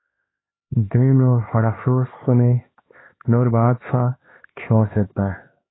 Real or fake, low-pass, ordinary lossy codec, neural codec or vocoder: fake; 7.2 kHz; AAC, 16 kbps; codec, 16 kHz, 2 kbps, X-Codec, HuBERT features, trained on LibriSpeech